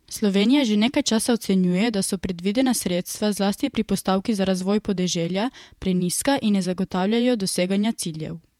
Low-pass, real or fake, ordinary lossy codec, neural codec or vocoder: 19.8 kHz; fake; MP3, 96 kbps; vocoder, 48 kHz, 128 mel bands, Vocos